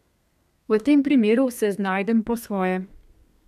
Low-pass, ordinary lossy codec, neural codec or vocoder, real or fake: 14.4 kHz; none; codec, 32 kHz, 1.9 kbps, SNAC; fake